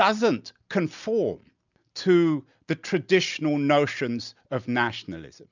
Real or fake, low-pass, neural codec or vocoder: real; 7.2 kHz; none